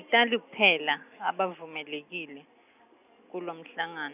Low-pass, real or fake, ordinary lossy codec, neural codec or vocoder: 3.6 kHz; real; none; none